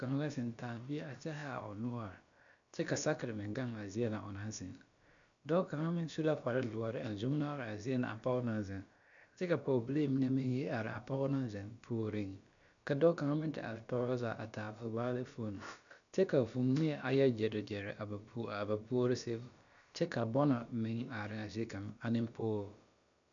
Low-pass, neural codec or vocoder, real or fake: 7.2 kHz; codec, 16 kHz, about 1 kbps, DyCAST, with the encoder's durations; fake